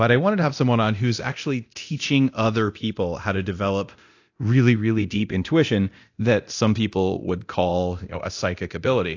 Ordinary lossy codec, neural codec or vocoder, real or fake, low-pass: AAC, 48 kbps; codec, 24 kHz, 0.9 kbps, DualCodec; fake; 7.2 kHz